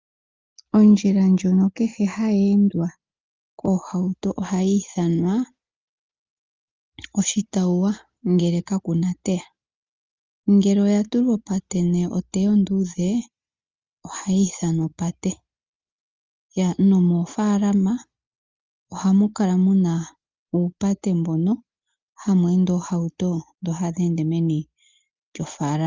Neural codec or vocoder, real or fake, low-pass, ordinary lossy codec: none; real; 7.2 kHz; Opus, 32 kbps